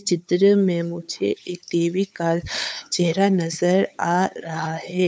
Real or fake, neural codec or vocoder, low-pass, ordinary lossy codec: fake; codec, 16 kHz, 8 kbps, FunCodec, trained on LibriTTS, 25 frames a second; none; none